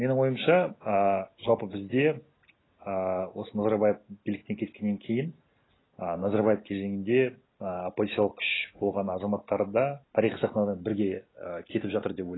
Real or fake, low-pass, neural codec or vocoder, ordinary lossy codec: real; 7.2 kHz; none; AAC, 16 kbps